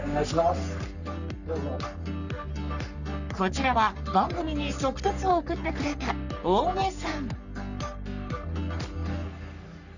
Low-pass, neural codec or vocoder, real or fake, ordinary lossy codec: 7.2 kHz; codec, 44.1 kHz, 3.4 kbps, Pupu-Codec; fake; none